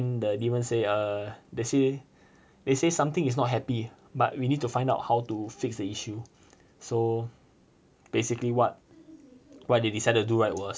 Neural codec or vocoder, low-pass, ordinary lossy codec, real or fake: none; none; none; real